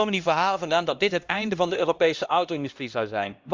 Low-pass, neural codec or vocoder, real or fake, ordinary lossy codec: 7.2 kHz; codec, 16 kHz, 1 kbps, X-Codec, HuBERT features, trained on LibriSpeech; fake; Opus, 32 kbps